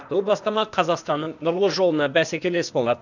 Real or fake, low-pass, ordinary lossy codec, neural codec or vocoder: fake; 7.2 kHz; none; codec, 16 kHz, 0.8 kbps, ZipCodec